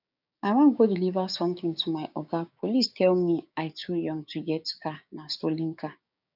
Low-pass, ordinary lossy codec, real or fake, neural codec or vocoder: 5.4 kHz; none; fake; codec, 16 kHz, 6 kbps, DAC